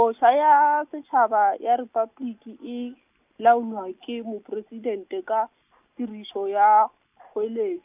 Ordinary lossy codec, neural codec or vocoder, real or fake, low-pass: none; none; real; 3.6 kHz